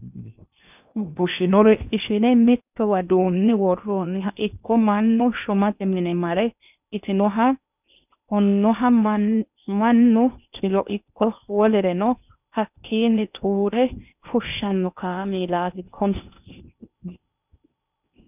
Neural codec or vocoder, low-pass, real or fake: codec, 16 kHz in and 24 kHz out, 0.8 kbps, FocalCodec, streaming, 65536 codes; 3.6 kHz; fake